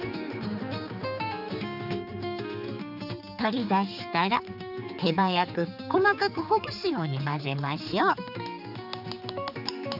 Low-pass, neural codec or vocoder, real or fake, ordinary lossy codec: 5.4 kHz; codec, 16 kHz, 4 kbps, X-Codec, HuBERT features, trained on general audio; fake; none